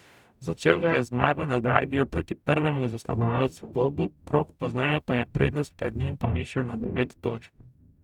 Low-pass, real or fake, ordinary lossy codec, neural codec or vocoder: 19.8 kHz; fake; none; codec, 44.1 kHz, 0.9 kbps, DAC